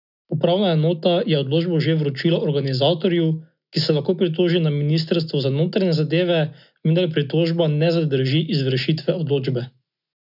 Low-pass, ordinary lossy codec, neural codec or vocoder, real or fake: 5.4 kHz; none; none; real